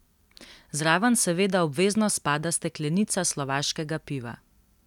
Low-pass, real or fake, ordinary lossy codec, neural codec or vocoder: 19.8 kHz; real; none; none